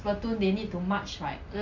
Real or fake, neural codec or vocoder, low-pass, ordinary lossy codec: real; none; 7.2 kHz; none